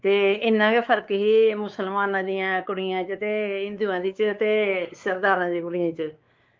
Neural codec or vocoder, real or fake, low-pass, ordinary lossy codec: codec, 16 kHz, 4 kbps, X-Codec, WavLM features, trained on Multilingual LibriSpeech; fake; 7.2 kHz; Opus, 24 kbps